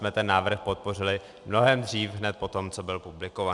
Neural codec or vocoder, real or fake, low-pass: none; real; 10.8 kHz